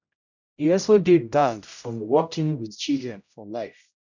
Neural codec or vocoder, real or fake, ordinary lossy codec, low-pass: codec, 16 kHz, 0.5 kbps, X-Codec, HuBERT features, trained on general audio; fake; none; 7.2 kHz